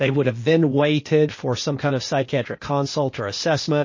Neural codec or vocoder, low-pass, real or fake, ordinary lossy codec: codec, 16 kHz, 0.8 kbps, ZipCodec; 7.2 kHz; fake; MP3, 32 kbps